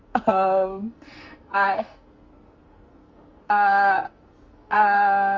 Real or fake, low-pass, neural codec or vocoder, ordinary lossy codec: fake; 7.2 kHz; codec, 44.1 kHz, 2.6 kbps, SNAC; Opus, 32 kbps